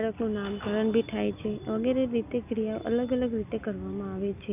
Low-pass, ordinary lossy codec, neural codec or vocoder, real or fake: 3.6 kHz; none; none; real